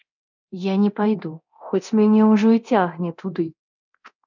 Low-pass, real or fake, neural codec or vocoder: 7.2 kHz; fake; codec, 24 kHz, 0.9 kbps, DualCodec